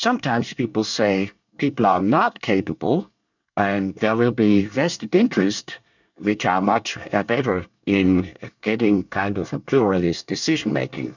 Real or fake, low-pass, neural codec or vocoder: fake; 7.2 kHz; codec, 24 kHz, 1 kbps, SNAC